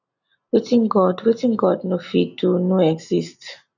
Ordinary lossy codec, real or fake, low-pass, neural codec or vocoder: none; real; 7.2 kHz; none